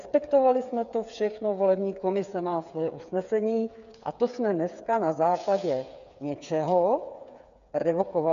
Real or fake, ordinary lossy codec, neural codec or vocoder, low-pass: fake; MP3, 96 kbps; codec, 16 kHz, 8 kbps, FreqCodec, smaller model; 7.2 kHz